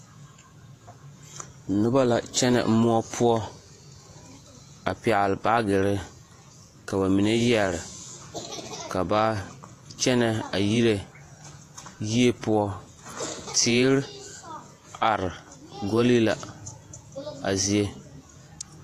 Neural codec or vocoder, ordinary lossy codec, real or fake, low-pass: vocoder, 48 kHz, 128 mel bands, Vocos; AAC, 48 kbps; fake; 14.4 kHz